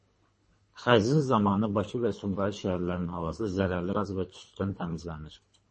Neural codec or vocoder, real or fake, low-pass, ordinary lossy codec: codec, 24 kHz, 3 kbps, HILCodec; fake; 10.8 kHz; MP3, 32 kbps